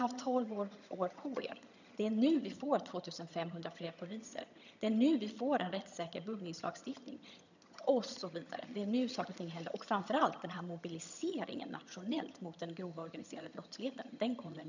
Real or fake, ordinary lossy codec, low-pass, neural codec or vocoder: fake; none; 7.2 kHz; vocoder, 22.05 kHz, 80 mel bands, HiFi-GAN